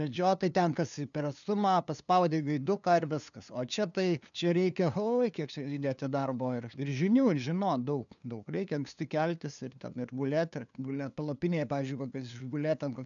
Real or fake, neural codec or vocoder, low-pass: fake; codec, 16 kHz, 2 kbps, FunCodec, trained on LibriTTS, 25 frames a second; 7.2 kHz